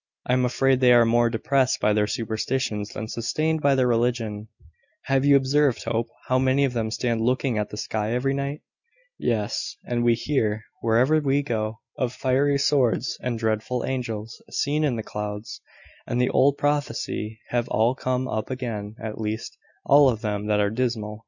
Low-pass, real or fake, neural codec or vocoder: 7.2 kHz; real; none